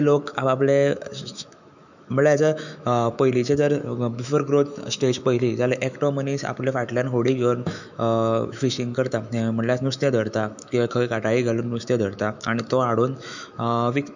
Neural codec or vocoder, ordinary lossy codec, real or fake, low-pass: autoencoder, 48 kHz, 128 numbers a frame, DAC-VAE, trained on Japanese speech; none; fake; 7.2 kHz